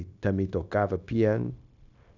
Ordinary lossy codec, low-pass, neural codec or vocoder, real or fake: none; 7.2 kHz; codec, 16 kHz, 0.9 kbps, LongCat-Audio-Codec; fake